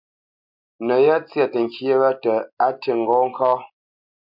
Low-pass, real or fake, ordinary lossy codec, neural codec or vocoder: 5.4 kHz; real; MP3, 48 kbps; none